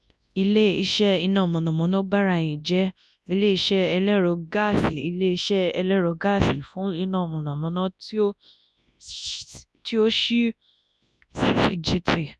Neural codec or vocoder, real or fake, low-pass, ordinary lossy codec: codec, 24 kHz, 0.9 kbps, WavTokenizer, large speech release; fake; none; none